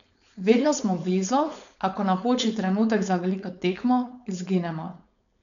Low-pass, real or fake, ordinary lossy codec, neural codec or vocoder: 7.2 kHz; fake; none; codec, 16 kHz, 4.8 kbps, FACodec